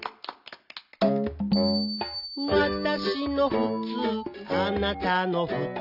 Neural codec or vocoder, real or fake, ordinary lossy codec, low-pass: none; real; none; 5.4 kHz